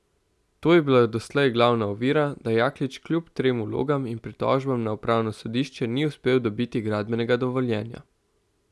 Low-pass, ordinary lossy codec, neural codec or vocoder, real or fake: none; none; none; real